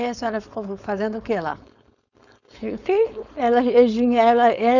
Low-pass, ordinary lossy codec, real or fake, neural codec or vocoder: 7.2 kHz; none; fake; codec, 16 kHz, 4.8 kbps, FACodec